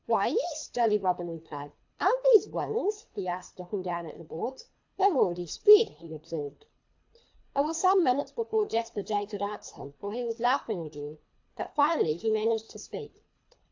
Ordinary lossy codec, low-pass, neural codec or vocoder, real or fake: AAC, 48 kbps; 7.2 kHz; codec, 24 kHz, 3 kbps, HILCodec; fake